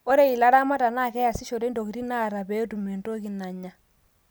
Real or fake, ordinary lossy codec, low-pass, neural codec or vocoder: real; none; none; none